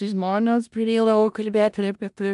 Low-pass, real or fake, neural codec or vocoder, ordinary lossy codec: 10.8 kHz; fake; codec, 16 kHz in and 24 kHz out, 0.4 kbps, LongCat-Audio-Codec, four codebook decoder; AAC, 96 kbps